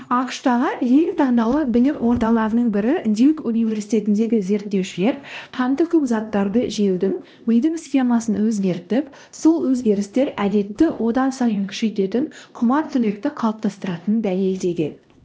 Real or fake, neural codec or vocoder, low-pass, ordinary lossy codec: fake; codec, 16 kHz, 1 kbps, X-Codec, HuBERT features, trained on LibriSpeech; none; none